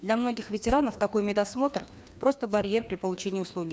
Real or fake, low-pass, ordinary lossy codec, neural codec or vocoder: fake; none; none; codec, 16 kHz, 2 kbps, FreqCodec, larger model